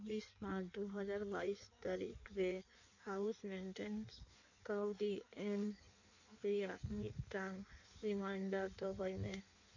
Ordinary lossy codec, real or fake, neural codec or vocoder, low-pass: none; fake; codec, 16 kHz in and 24 kHz out, 1.1 kbps, FireRedTTS-2 codec; 7.2 kHz